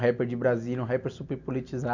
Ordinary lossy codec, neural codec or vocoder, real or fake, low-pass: none; none; real; 7.2 kHz